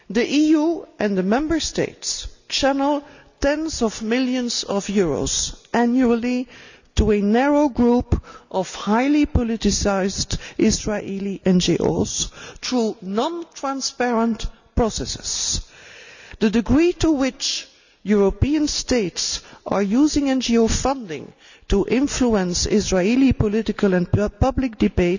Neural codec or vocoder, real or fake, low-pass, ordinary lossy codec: none; real; 7.2 kHz; none